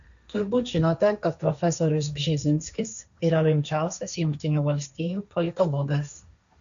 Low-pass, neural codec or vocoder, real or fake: 7.2 kHz; codec, 16 kHz, 1.1 kbps, Voila-Tokenizer; fake